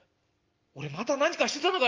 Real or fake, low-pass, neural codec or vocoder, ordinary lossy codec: real; 7.2 kHz; none; Opus, 32 kbps